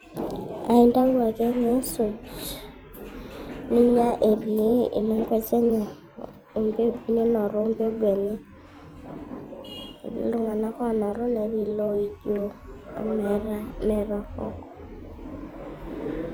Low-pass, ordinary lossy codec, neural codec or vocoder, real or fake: none; none; vocoder, 44.1 kHz, 128 mel bands every 512 samples, BigVGAN v2; fake